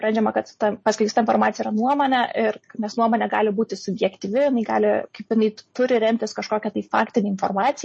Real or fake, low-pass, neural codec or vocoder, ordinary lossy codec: real; 9.9 kHz; none; MP3, 32 kbps